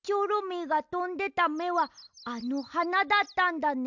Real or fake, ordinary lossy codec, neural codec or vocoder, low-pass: real; none; none; 7.2 kHz